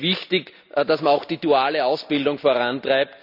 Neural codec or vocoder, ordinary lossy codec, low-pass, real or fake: none; none; 5.4 kHz; real